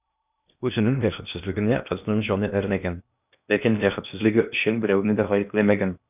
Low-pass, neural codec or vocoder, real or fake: 3.6 kHz; codec, 16 kHz in and 24 kHz out, 0.8 kbps, FocalCodec, streaming, 65536 codes; fake